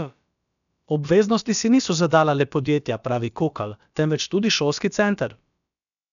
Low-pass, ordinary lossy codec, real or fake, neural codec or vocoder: 7.2 kHz; none; fake; codec, 16 kHz, about 1 kbps, DyCAST, with the encoder's durations